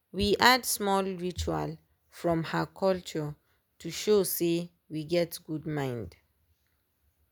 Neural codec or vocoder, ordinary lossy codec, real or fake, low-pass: none; none; real; none